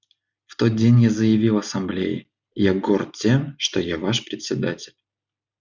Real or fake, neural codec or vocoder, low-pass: real; none; 7.2 kHz